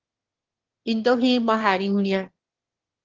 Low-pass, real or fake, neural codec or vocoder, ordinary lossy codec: 7.2 kHz; fake; autoencoder, 22.05 kHz, a latent of 192 numbers a frame, VITS, trained on one speaker; Opus, 16 kbps